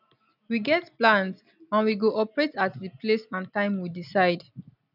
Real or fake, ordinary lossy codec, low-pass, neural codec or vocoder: real; none; 5.4 kHz; none